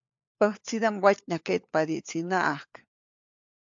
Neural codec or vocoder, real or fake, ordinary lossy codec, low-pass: codec, 16 kHz, 4 kbps, FunCodec, trained on LibriTTS, 50 frames a second; fake; AAC, 64 kbps; 7.2 kHz